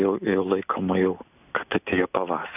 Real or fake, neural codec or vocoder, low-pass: real; none; 3.6 kHz